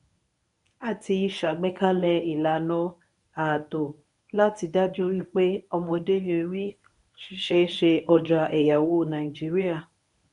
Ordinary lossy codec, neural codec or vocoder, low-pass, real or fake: none; codec, 24 kHz, 0.9 kbps, WavTokenizer, medium speech release version 1; 10.8 kHz; fake